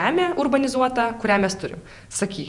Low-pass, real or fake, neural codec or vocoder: 10.8 kHz; fake; vocoder, 48 kHz, 128 mel bands, Vocos